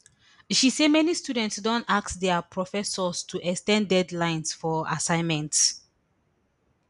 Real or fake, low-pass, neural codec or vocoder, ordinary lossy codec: real; 10.8 kHz; none; none